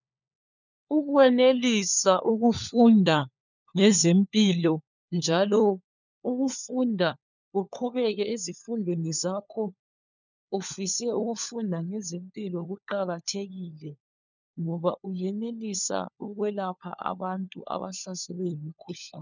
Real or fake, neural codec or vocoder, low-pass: fake; codec, 16 kHz, 4 kbps, FunCodec, trained on LibriTTS, 50 frames a second; 7.2 kHz